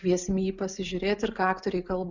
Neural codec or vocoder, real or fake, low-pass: none; real; 7.2 kHz